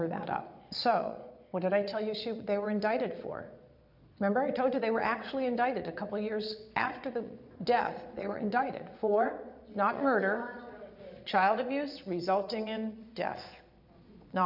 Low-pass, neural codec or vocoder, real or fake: 5.4 kHz; vocoder, 22.05 kHz, 80 mel bands, Vocos; fake